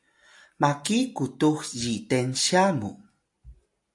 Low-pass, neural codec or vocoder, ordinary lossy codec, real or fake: 10.8 kHz; none; AAC, 64 kbps; real